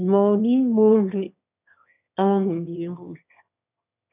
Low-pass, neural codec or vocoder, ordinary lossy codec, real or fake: 3.6 kHz; autoencoder, 22.05 kHz, a latent of 192 numbers a frame, VITS, trained on one speaker; none; fake